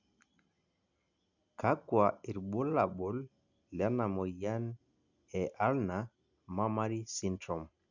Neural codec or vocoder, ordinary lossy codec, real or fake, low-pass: none; none; real; 7.2 kHz